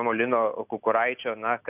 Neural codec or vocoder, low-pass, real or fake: none; 3.6 kHz; real